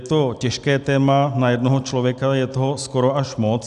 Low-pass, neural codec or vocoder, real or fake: 10.8 kHz; none; real